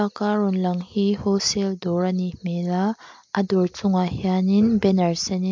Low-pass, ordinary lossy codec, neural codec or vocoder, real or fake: 7.2 kHz; MP3, 48 kbps; none; real